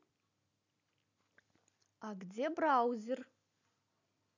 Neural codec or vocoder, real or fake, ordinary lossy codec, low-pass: none; real; none; 7.2 kHz